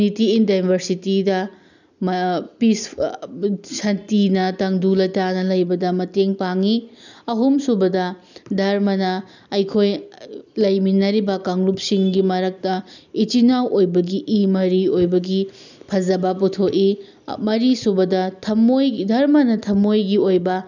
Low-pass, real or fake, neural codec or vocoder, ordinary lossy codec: 7.2 kHz; real; none; none